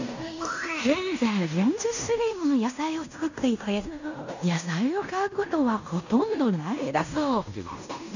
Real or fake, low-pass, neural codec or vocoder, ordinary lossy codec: fake; 7.2 kHz; codec, 16 kHz in and 24 kHz out, 0.9 kbps, LongCat-Audio-Codec, fine tuned four codebook decoder; none